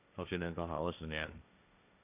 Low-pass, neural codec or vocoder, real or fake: 3.6 kHz; codec, 16 kHz, 1.1 kbps, Voila-Tokenizer; fake